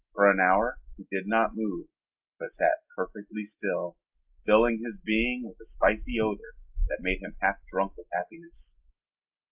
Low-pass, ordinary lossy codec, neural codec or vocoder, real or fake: 3.6 kHz; Opus, 24 kbps; none; real